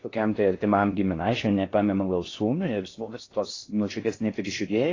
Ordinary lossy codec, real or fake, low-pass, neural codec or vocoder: AAC, 32 kbps; fake; 7.2 kHz; codec, 16 kHz in and 24 kHz out, 0.6 kbps, FocalCodec, streaming, 4096 codes